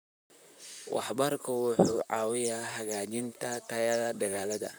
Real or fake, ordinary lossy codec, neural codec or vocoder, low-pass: fake; none; vocoder, 44.1 kHz, 128 mel bands, Pupu-Vocoder; none